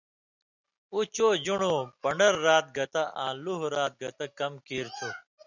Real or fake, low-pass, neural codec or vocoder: real; 7.2 kHz; none